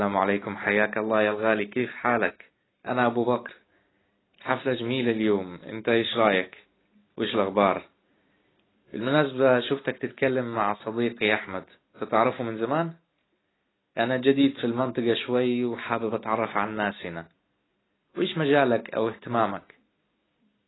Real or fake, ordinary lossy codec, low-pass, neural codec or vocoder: real; AAC, 16 kbps; 7.2 kHz; none